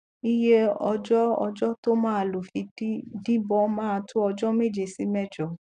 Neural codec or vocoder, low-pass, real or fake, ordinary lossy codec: none; 9.9 kHz; real; AAC, 96 kbps